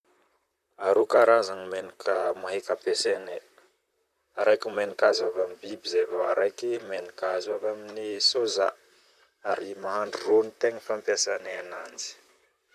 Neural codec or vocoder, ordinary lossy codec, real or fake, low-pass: vocoder, 44.1 kHz, 128 mel bands, Pupu-Vocoder; none; fake; 14.4 kHz